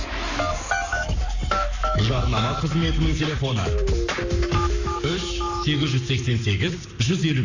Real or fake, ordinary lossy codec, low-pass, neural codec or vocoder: fake; none; 7.2 kHz; codec, 44.1 kHz, 7.8 kbps, Pupu-Codec